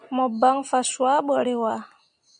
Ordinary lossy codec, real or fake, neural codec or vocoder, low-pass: MP3, 96 kbps; real; none; 9.9 kHz